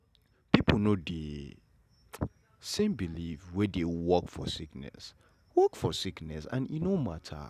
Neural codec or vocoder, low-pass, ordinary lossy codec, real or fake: none; 14.4 kHz; none; real